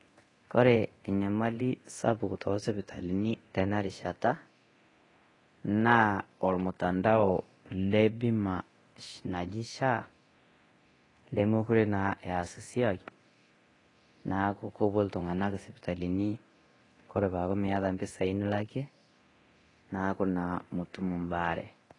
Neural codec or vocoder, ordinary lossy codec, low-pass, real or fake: codec, 24 kHz, 0.9 kbps, DualCodec; AAC, 32 kbps; 10.8 kHz; fake